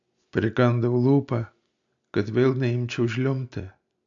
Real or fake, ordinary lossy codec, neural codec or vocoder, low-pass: real; AAC, 64 kbps; none; 7.2 kHz